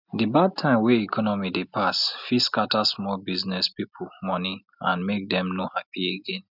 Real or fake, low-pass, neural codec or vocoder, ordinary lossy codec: real; 5.4 kHz; none; none